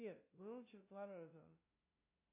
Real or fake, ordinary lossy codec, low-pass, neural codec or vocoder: fake; MP3, 24 kbps; 3.6 kHz; codec, 16 kHz, 0.5 kbps, FunCodec, trained on LibriTTS, 25 frames a second